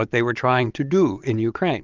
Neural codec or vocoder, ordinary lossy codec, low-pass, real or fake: vocoder, 22.05 kHz, 80 mel bands, Vocos; Opus, 32 kbps; 7.2 kHz; fake